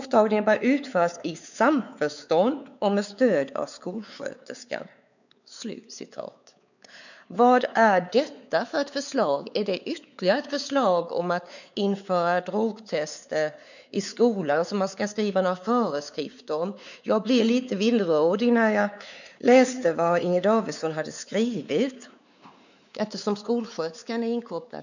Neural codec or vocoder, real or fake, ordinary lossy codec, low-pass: codec, 16 kHz, 4 kbps, X-Codec, WavLM features, trained on Multilingual LibriSpeech; fake; none; 7.2 kHz